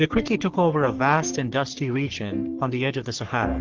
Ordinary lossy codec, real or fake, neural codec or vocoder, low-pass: Opus, 16 kbps; fake; codec, 44.1 kHz, 3.4 kbps, Pupu-Codec; 7.2 kHz